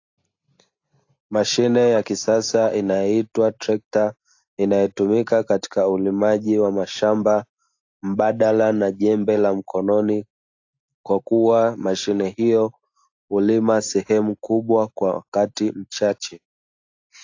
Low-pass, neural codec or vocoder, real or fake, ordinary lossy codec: 7.2 kHz; none; real; AAC, 48 kbps